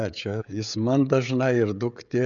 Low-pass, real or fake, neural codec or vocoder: 7.2 kHz; fake; codec, 16 kHz, 16 kbps, FreqCodec, smaller model